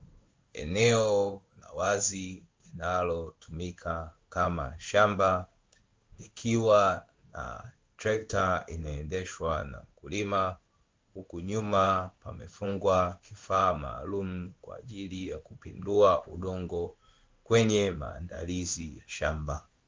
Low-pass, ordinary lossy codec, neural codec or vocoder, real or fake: 7.2 kHz; Opus, 32 kbps; codec, 16 kHz in and 24 kHz out, 1 kbps, XY-Tokenizer; fake